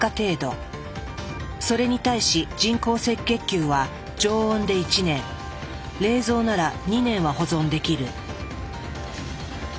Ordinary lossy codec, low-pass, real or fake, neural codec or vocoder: none; none; real; none